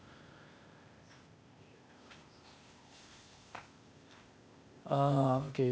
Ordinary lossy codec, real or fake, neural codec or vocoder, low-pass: none; fake; codec, 16 kHz, 0.8 kbps, ZipCodec; none